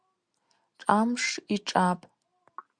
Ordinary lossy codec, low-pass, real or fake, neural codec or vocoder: Opus, 64 kbps; 9.9 kHz; real; none